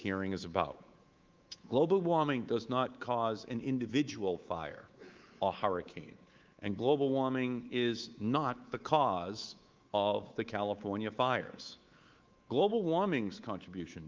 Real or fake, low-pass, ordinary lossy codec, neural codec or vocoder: fake; 7.2 kHz; Opus, 32 kbps; codec, 24 kHz, 3.1 kbps, DualCodec